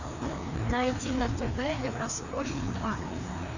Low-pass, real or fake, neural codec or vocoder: 7.2 kHz; fake; codec, 16 kHz, 2 kbps, FreqCodec, larger model